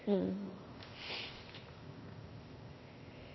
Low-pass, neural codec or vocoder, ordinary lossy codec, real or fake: 7.2 kHz; codec, 16 kHz, 0.8 kbps, ZipCodec; MP3, 24 kbps; fake